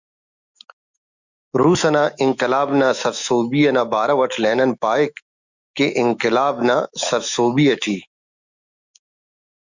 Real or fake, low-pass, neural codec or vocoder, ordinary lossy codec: fake; 7.2 kHz; autoencoder, 48 kHz, 128 numbers a frame, DAC-VAE, trained on Japanese speech; Opus, 64 kbps